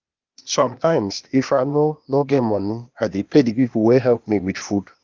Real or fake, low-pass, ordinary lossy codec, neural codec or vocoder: fake; 7.2 kHz; Opus, 24 kbps; codec, 16 kHz, 0.8 kbps, ZipCodec